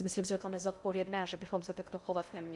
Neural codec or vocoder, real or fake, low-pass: codec, 16 kHz in and 24 kHz out, 0.6 kbps, FocalCodec, streaming, 2048 codes; fake; 10.8 kHz